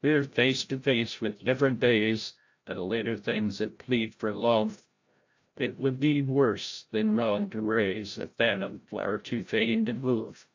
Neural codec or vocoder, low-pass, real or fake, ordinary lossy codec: codec, 16 kHz, 0.5 kbps, FreqCodec, larger model; 7.2 kHz; fake; AAC, 48 kbps